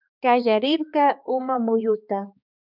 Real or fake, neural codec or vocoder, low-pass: fake; codec, 16 kHz, 2 kbps, X-Codec, HuBERT features, trained on balanced general audio; 5.4 kHz